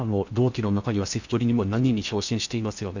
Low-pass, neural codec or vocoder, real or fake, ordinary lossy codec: 7.2 kHz; codec, 16 kHz in and 24 kHz out, 0.6 kbps, FocalCodec, streaming, 2048 codes; fake; none